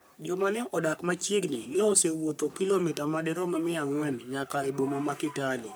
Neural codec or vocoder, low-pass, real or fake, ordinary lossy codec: codec, 44.1 kHz, 3.4 kbps, Pupu-Codec; none; fake; none